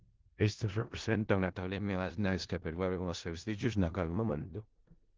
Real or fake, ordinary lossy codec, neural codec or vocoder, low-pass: fake; Opus, 16 kbps; codec, 16 kHz in and 24 kHz out, 0.4 kbps, LongCat-Audio-Codec, four codebook decoder; 7.2 kHz